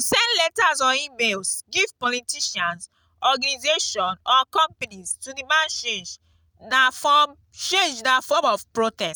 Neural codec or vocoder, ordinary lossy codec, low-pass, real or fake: none; none; none; real